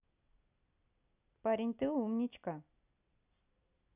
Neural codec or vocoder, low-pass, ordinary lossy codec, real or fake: none; 3.6 kHz; none; real